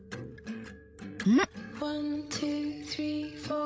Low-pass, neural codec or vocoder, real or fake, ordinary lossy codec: none; codec, 16 kHz, 16 kbps, FreqCodec, larger model; fake; none